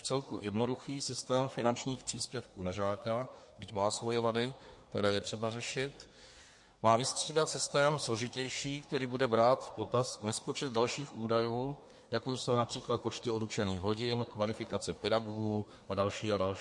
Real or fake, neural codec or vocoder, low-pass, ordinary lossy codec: fake; codec, 24 kHz, 1 kbps, SNAC; 10.8 kHz; MP3, 48 kbps